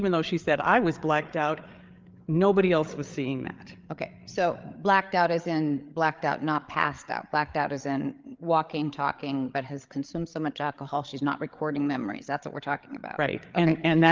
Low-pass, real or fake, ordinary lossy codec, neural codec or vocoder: 7.2 kHz; fake; Opus, 32 kbps; codec, 16 kHz, 8 kbps, FreqCodec, larger model